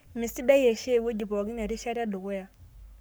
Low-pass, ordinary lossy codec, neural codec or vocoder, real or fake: none; none; codec, 44.1 kHz, 7.8 kbps, Pupu-Codec; fake